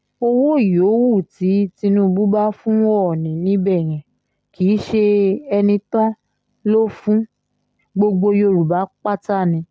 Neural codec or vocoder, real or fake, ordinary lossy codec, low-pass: none; real; none; none